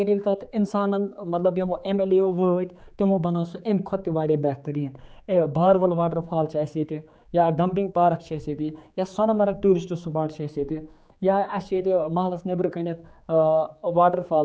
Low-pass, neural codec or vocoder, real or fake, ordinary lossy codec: none; codec, 16 kHz, 4 kbps, X-Codec, HuBERT features, trained on general audio; fake; none